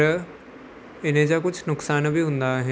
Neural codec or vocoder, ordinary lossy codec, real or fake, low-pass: none; none; real; none